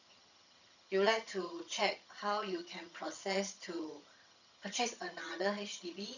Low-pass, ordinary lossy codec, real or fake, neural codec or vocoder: 7.2 kHz; none; fake; vocoder, 22.05 kHz, 80 mel bands, HiFi-GAN